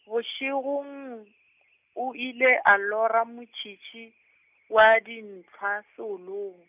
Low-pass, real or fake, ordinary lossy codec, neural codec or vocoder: 3.6 kHz; real; none; none